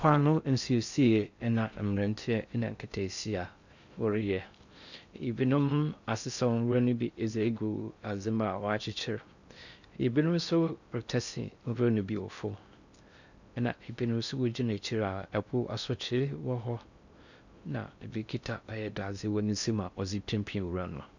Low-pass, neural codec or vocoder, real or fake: 7.2 kHz; codec, 16 kHz in and 24 kHz out, 0.6 kbps, FocalCodec, streaming, 4096 codes; fake